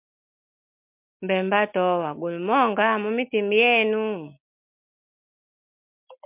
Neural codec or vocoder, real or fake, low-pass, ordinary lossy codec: none; real; 3.6 kHz; MP3, 32 kbps